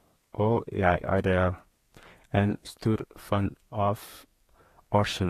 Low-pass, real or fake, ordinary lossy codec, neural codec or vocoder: 14.4 kHz; fake; AAC, 48 kbps; codec, 32 kHz, 1.9 kbps, SNAC